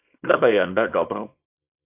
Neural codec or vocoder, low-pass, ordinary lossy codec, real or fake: codec, 24 kHz, 0.9 kbps, WavTokenizer, small release; 3.6 kHz; AAC, 32 kbps; fake